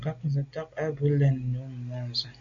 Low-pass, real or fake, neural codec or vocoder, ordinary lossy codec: 7.2 kHz; real; none; AAC, 48 kbps